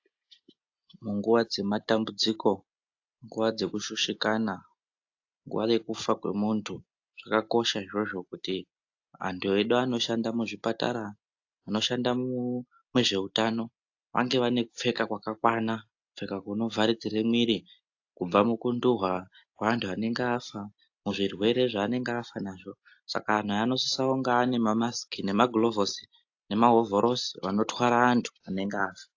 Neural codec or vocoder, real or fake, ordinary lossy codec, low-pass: none; real; AAC, 48 kbps; 7.2 kHz